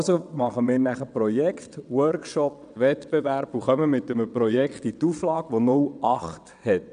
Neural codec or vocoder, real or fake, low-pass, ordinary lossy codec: vocoder, 22.05 kHz, 80 mel bands, WaveNeXt; fake; 9.9 kHz; none